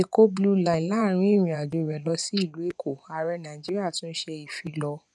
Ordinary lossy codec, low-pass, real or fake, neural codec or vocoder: none; none; fake; vocoder, 24 kHz, 100 mel bands, Vocos